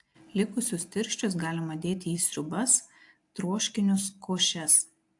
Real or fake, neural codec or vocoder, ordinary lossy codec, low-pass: real; none; AAC, 64 kbps; 10.8 kHz